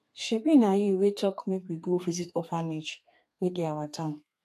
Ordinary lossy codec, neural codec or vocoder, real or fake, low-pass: AAC, 64 kbps; codec, 32 kHz, 1.9 kbps, SNAC; fake; 14.4 kHz